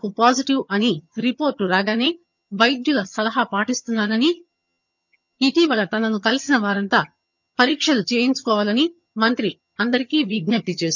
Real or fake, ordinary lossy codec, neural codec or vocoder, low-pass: fake; none; vocoder, 22.05 kHz, 80 mel bands, HiFi-GAN; 7.2 kHz